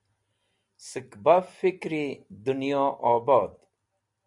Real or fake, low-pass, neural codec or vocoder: real; 10.8 kHz; none